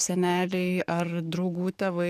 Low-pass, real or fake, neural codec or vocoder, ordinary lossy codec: 14.4 kHz; fake; codec, 44.1 kHz, 7.8 kbps, DAC; AAC, 96 kbps